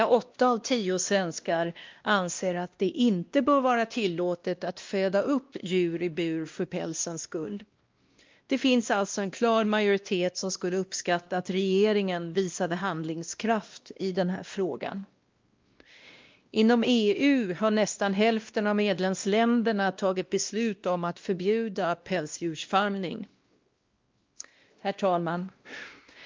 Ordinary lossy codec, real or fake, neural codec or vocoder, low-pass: Opus, 24 kbps; fake; codec, 16 kHz, 1 kbps, X-Codec, WavLM features, trained on Multilingual LibriSpeech; 7.2 kHz